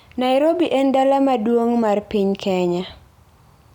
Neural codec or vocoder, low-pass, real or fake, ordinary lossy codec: none; 19.8 kHz; real; none